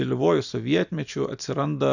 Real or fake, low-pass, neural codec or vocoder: real; 7.2 kHz; none